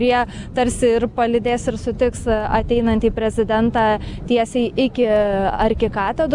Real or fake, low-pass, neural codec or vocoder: real; 10.8 kHz; none